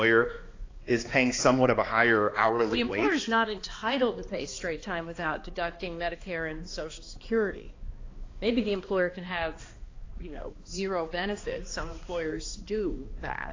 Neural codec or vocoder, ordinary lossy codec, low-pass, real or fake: codec, 16 kHz, 2 kbps, X-Codec, HuBERT features, trained on balanced general audio; AAC, 32 kbps; 7.2 kHz; fake